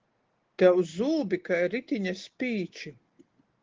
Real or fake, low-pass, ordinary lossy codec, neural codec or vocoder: real; 7.2 kHz; Opus, 16 kbps; none